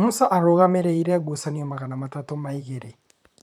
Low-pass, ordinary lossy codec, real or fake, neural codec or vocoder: 19.8 kHz; none; fake; vocoder, 44.1 kHz, 128 mel bands, Pupu-Vocoder